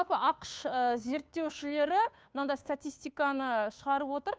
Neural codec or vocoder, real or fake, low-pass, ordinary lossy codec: codec, 16 kHz, 2 kbps, FunCodec, trained on Chinese and English, 25 frames a second; fake; none; none